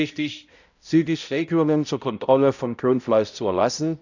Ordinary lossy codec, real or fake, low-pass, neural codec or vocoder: none; fake; 7.2 kHz; codec, 16 kHz, 0.5 kbps, X-Codec, HuBERT features, trained on balanced general audio